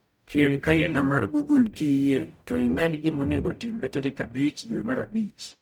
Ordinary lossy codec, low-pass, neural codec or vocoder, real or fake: none; none; codec, 44.1 kHz, 0.9 kbps, DAC; fake